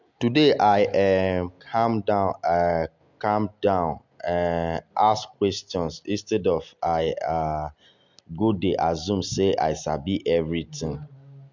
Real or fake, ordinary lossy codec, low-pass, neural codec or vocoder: real; MP3, 64 kbps; 7.2 kHz; none